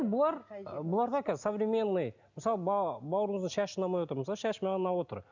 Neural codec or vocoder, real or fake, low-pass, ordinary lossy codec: none; real; 7.2 kHz; none